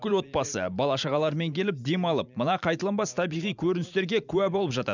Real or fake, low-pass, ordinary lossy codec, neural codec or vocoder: fake; 7.2 kHz; none; vocoder, 44.1 kHz, 128 mel bands every 512 samples, BigVGAN v2